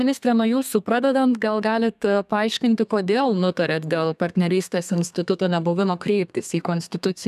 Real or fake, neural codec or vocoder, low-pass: fake; codec, 32 kHz, 1.9 kbps, SNAC; 14.4 kHz